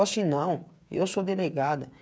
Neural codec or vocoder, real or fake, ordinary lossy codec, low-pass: codec, 16 kHz, 8 kbps, FreqCodec, smaller model; fake; none; none